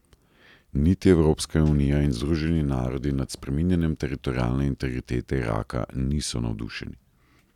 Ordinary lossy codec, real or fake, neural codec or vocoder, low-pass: none; real; none; 19.8 kHz